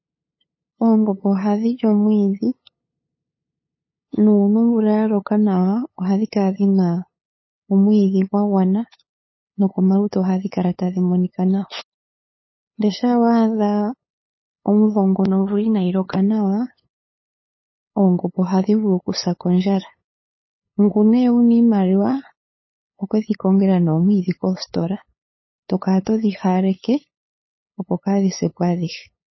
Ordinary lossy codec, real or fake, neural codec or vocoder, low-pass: MP3, 24 kbps; fake; codec, 16 kHz, 8 kbps, FunCodec, trained on LibriTTS, 25 frames a second; 7.2 kHz